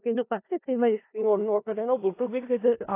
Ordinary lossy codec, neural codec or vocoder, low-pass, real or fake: AAC, 24 kbps; codec, 16 kHz in and 24 kHz out, 0.4 kbps, LongCat-Audio-Codec, four codebook decoder; 3.6 kHz; fake